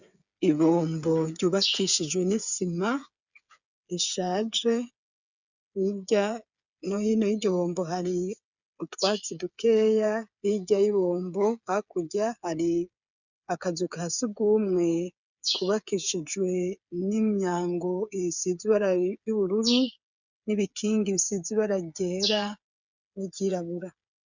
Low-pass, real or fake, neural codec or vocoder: 7.2 kHz; fake; codec, 16 kHz, 8 kbps, FreqCodec, smaller model